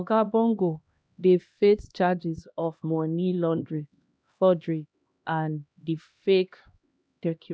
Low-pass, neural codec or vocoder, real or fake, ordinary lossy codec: none; codec, 16 kHz, 1 kbps, X-Codec, HuBERT features, trained on LibriSpeech; fake; none